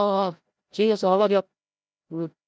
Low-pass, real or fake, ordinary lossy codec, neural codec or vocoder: none; fake; none; codec, 16 kHz, 0.5 kbps, FreqCodec, larger model